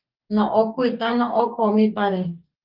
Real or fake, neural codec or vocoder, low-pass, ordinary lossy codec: fake; codec, 44.1 kHz, 2.6 kbps, DAC; 5.4 kHz; Opus, 24 kbps